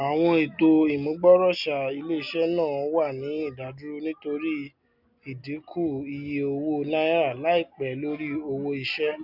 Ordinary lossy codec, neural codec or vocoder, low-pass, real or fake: none; none; 5.4 kHz; real